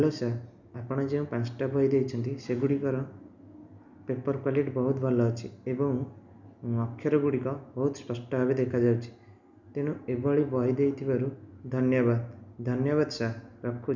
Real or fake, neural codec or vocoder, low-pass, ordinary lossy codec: real; none; 7.2 kHz; none